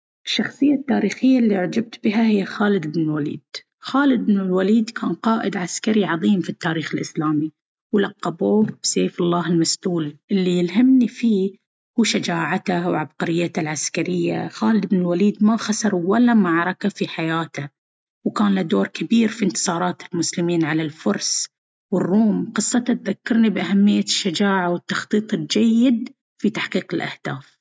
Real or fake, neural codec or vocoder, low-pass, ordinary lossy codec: real; none; none; none